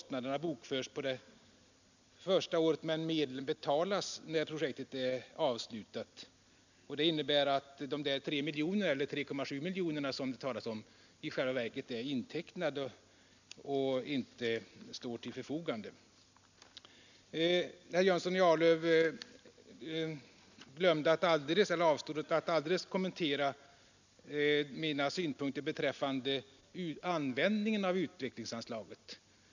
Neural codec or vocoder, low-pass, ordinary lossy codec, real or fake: none; 7.2 kHz; none; real